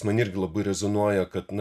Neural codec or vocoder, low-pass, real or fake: none; 14.4 kHz; real